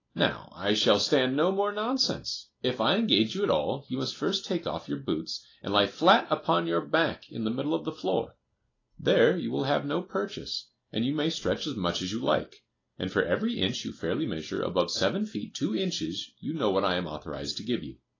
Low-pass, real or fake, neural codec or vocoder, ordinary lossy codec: 7.2 kHz; real; none; AAC, 32 kbps